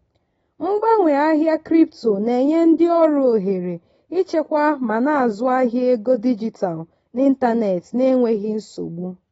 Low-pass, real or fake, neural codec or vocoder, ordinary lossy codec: 19.8 kHz; real; none; AAC, 24 kbps